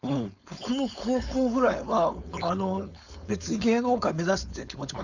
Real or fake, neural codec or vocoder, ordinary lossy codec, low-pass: fake; codec, 16 kHz, 4.8 kbps, FACodec; none; 7.2 kHz